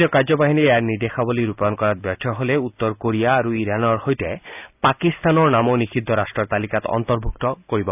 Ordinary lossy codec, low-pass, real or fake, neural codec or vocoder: none; 3.6 kHz; real; none